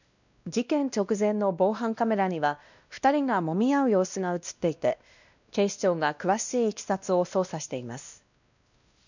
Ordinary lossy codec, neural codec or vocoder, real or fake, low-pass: none; codec, 16 kHz, 1 kbps, X-Codec, WavLM features, trained on Multilingual LibriSpeech; fake; 7.2 kHz